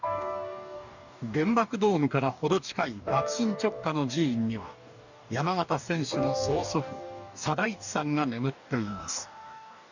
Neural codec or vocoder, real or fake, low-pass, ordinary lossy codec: codec, 44.1 kHz, 2.6 kbps, DAC; fake; 7.2 kHz; none